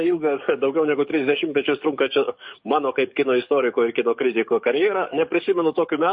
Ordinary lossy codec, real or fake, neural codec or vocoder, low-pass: MP3, 32 kbps; fake; codec, 44.1 kHz, 7.8 kbps, DAC; 10.8 kHz